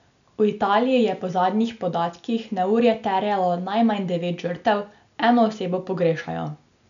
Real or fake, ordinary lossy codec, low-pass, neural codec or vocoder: real; none; 7.2 kHz; none